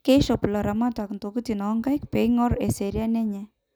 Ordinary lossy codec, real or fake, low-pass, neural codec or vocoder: none; real; none; none